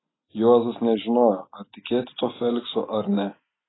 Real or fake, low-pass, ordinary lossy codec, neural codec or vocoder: real; 7.2 kHz; AAC, 16 kbps; none